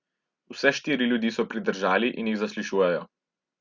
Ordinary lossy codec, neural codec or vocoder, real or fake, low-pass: Opus, 64 kbps; none; real; 7.2 kHz